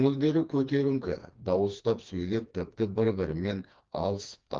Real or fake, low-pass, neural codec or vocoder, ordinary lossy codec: fake; 7.2 kHz; codec, 16 kHz, 2 kbps, FreqCodec, smaller model; Opus, 32 kbps